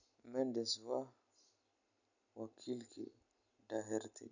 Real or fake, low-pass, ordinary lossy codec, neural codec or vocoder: real; 7.2 kHz; none; none